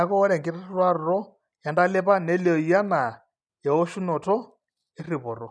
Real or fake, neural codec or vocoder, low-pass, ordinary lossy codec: real; none; none; none